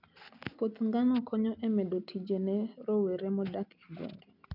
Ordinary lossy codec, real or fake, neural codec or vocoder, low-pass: none; real; none; 5.4 kHz